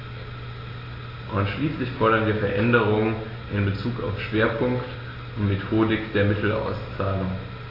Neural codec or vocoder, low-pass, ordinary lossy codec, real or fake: none; 5.4 kHz; AAC, 24 kbps; real